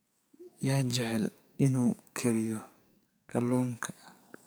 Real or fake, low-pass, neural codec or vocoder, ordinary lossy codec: fake; none; codec, 44.1 kHz, 2.6 kbps, SNAC; none